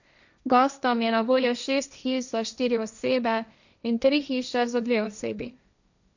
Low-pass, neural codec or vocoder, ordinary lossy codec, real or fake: 7.2 kHz; codec, 16 kHz, 1.1 kbps, Voila-Tokenizer; none; fake